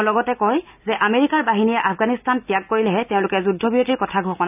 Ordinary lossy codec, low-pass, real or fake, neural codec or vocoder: none; 3.6 kHz; real; none